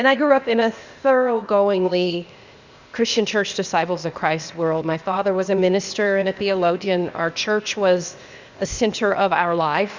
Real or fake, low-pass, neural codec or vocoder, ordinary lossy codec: fake; 7.2 kHz; codec, 16 kHz, 0.8 kbps, ZipCodec; Opus, 64 kbps